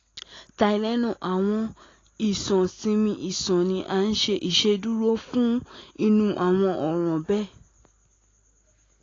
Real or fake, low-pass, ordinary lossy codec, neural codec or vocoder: real; 7.2 kHz; AAC, 32 kbps; none